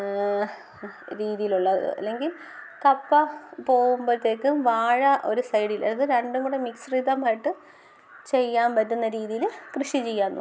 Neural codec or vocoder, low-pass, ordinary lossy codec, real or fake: none; none; none; real